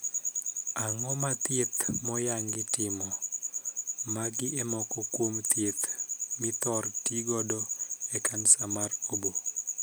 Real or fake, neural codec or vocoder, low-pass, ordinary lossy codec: real; none; none; none